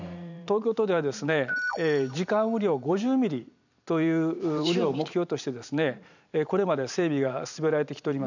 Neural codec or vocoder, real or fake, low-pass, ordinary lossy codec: vocoder, 44.1 kHz, 128 mel bands every 512 samples, BigVGAN v2; fake; 7.2 kHz; none